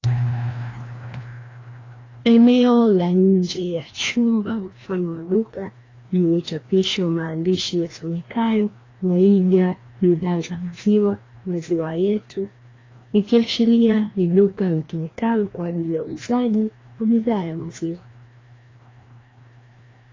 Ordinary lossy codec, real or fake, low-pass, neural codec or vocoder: AAC, 32 kbps; fake; 7.2 kHz; codec, 16 kHz, 1 kbps, FreqCodec, larger model